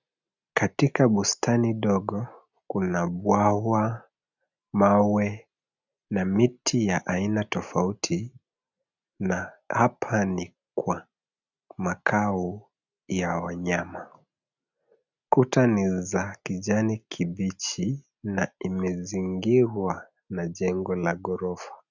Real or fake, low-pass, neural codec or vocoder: real; 7.2 kHz; none